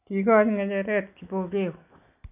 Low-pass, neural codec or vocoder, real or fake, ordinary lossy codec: 3.6 kHz; none; real; none